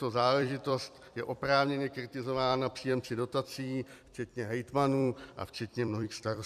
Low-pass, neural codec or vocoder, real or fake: 14.4 kHz; vocoder, 44.1 kHz, 128 mel bands every 256 samples, BigVGAN v2; fake